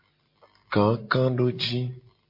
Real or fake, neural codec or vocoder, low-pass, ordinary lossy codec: real; none; 5.4 kHz; MP3, 32 kbps